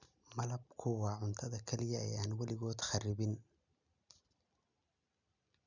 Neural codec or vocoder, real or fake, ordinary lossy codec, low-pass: none; real; none; 7.2 kHz